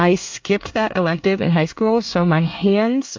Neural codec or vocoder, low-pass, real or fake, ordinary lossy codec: codec, 24 kHz, 1 kbps, SNAC; 7.2 kHz; fake; MP3, 48 kbps